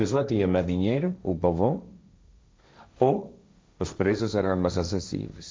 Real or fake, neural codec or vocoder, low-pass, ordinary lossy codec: fake; codec, 16 kHz, 1.1 kbps, Voila-Tokenizer; none; none